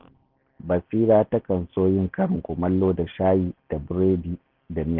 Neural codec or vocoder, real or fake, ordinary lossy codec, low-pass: none; real; Opus, 16 kbps; 5.4 kHz